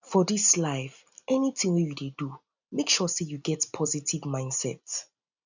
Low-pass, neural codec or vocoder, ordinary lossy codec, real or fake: 7.2 kHz; none; none; real